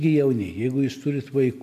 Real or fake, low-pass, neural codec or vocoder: real; 14.4 kHz; none